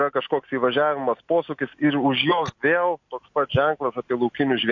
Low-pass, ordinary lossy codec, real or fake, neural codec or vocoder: 7.2 kHz; MP3, 48 kbps; real; none